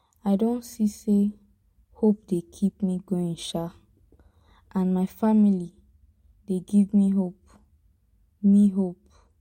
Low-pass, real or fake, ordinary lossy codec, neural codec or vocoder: 19.8 kHz; real; MP3, 64 kbps; none